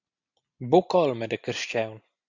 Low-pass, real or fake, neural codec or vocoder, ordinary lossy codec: 7.2 kHz; real; none; Opus, 64 kbps